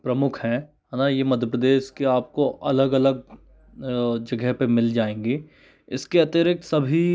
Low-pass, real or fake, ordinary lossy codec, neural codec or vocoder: none; real; none; none